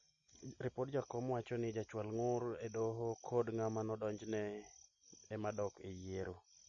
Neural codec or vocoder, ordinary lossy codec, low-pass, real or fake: none; MP3, 32 kbps; 7.2 kHz; real